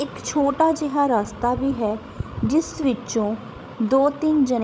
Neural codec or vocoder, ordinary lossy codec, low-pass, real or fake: codec, 16 kHz, 16 kbps, FreqCodec, larger model; none; none; fake